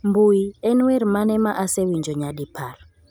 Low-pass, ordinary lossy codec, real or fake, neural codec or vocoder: none; none; real; none